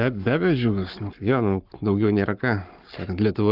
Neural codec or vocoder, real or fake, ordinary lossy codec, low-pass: codec, 44.1 kHz, 7.8 kbps, Pupu-Codec; fake; Opus, 32 kbps; 5.4 kHz